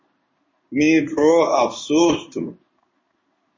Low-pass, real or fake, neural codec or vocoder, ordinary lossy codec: 7.2 kHz; fake; codec, 16 kHz in and 24 kHz out, 1 kbps, XY-Tokenizer; MP3, 32 kbps